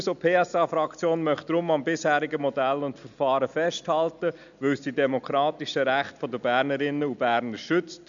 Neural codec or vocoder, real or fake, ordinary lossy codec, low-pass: none; real; none; 7.2 kHz